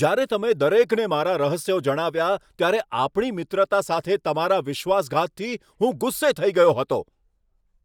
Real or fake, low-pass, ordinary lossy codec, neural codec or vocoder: real; 19.8 kHz; none; none